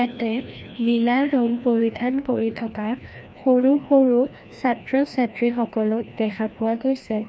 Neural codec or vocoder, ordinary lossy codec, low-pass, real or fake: codec, 16 kHz, 1 kbps, FreqCodec, larger model; none; none; fake